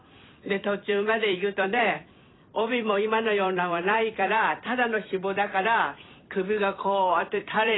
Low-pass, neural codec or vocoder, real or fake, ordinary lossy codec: 7.2 kHz; vocoder, 44.1 kHz, 128 mel bands every 256 samples, BigVGAN v2; fake; AAC, 16 kbps